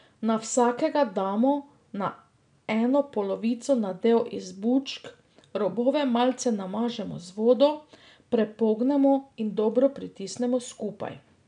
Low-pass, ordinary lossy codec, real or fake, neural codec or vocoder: 9.9 kHz; none; real; none